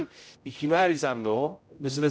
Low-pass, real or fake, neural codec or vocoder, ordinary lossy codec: none; fake; codec, 16 kHz, 0.5 kbps, X-Codec, HuBERT features, trained on general audio; none